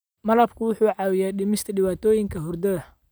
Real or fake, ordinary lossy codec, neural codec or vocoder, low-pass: real; none; none; none